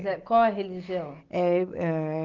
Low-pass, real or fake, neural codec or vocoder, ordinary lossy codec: 7.2 kHz; real; none; Opus, 32 kbps